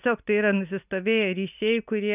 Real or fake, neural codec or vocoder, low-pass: real; none; 3.6 kHz